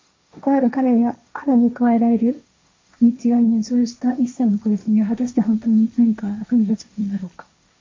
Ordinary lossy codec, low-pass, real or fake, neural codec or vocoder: MP3, 48 kbps; 7.2 kHz; fake; codec, 16 kHz, 1.1 kbps, Voila-Tokenizer